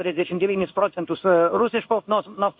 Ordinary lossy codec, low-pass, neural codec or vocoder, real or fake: MP3, 48 kbps; 5.4 kHz; codec, 16 kHz in and 24 kHz out, 1 kbps, XY-Tokenizer; fake